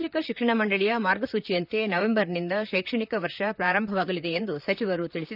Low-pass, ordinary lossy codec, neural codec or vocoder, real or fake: 5.4 kHz; none; vocoder, 44.1 kHz, 128 mel bands, Pupu-Vocoder; fake